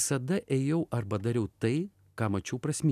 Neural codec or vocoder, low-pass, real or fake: none; 14.4 kHz; real